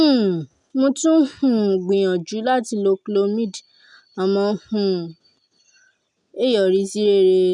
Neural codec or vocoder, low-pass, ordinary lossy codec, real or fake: none; 10.8 kHz; none; real